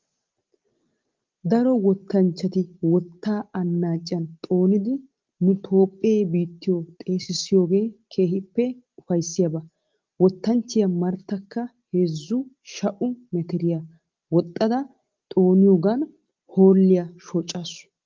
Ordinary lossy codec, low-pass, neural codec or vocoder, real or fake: Opus, 32 kbps; 7.2 kHz; none; real